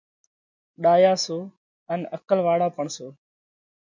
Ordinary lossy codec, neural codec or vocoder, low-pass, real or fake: MP3, 48 kbps; none; 7.2 kHz; real